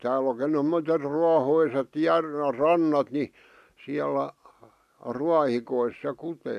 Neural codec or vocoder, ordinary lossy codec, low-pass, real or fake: none; MP3, 96 kbps; 14.4 kHz; real